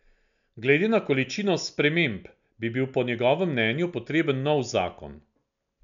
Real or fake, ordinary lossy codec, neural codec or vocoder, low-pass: real; none; none; 7.2 kHz